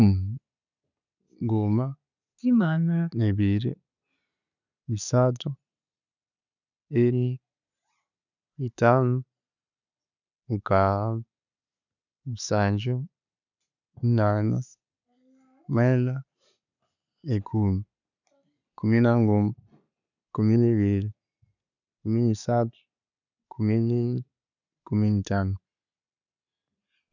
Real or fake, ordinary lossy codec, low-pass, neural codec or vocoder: real; none; 7.2 kHz; none